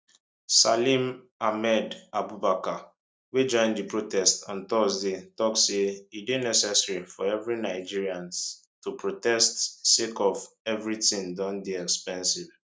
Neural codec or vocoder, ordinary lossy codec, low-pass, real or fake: none; none; none; real